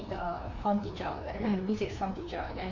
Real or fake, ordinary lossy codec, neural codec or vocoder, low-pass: fake; AAC, 48 kbps; codec, 16 kHz, 2 kbps, FreqCodec, larger model; 7.2 kHz